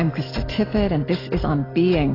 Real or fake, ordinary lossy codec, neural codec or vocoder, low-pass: real; AAC, 24 kbps; none; 5.4 kHz